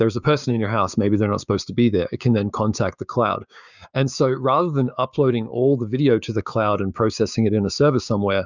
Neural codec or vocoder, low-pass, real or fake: codec, 16 kHz, 6 kbps, DAC; 7.2 kHz; fake